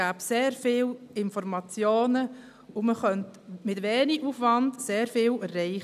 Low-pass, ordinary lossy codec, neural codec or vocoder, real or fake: 14.4 kHz; none; none; real